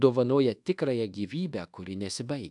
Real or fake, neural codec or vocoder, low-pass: fake; codec, 24 kHz, 1.2 kbps, DualCodec; 10.8 kHz